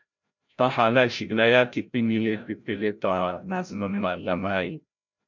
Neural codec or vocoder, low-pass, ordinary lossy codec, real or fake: codec, 16 kHz, 0.5 kbps, FreqCodec, larger model; 7.2 kHz; MP3, 64 kbps; fake